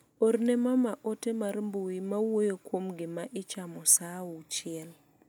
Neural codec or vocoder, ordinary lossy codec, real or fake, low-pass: none; none; real; none